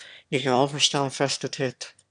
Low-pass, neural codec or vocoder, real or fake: 9.9 kHz; autoencoder, 22.05 kHz, a latent of 192 numbers a frame, VITS, trained on one speaker; fake